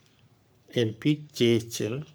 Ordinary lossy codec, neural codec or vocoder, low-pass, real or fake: none; codec, 44.1 kHz, 3.4 kbps, Pupu-Codec; none; fake